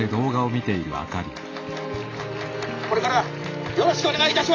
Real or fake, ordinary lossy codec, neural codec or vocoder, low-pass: real; AAC, 32 kbps; none; 7.2 kHz